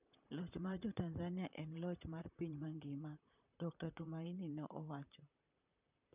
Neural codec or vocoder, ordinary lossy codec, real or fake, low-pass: vocoder, 44.1 kHz, 128 mel bands, Pupu-Vocoder; none; fake; 3.6 kHz